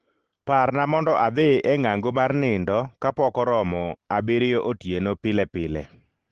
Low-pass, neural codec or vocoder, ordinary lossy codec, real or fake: 10.8 kHz; none; Opus, 16 kbps; real